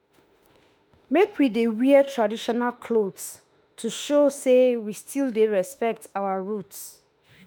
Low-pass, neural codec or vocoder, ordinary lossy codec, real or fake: none; autoencoder, 48 kHz, 32 numbers a frame, DAC-VAE, trained on Japanese speech; none; fake